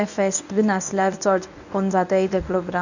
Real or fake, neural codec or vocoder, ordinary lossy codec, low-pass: fake; codec, 24 kHz, 0.9 kbps, WavTokenizer, medium speech release version 1; none; 7.2 kHz